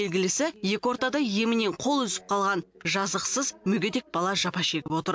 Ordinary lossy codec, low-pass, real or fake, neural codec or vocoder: none; none; real; none